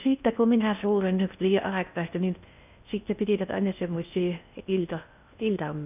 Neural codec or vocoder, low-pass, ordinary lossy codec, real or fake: codec, 16 kHz in and 24 kHz out, 0.6 kbps, FocalCodec, streaming, 4096 codes; 3.6 kHz; none; fake